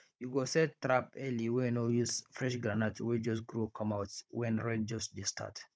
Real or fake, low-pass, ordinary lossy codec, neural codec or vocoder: fake; none; none; codec, 16 kHz, 16 kbps, FunCodec, trained on Chinese and English, 50 frames a second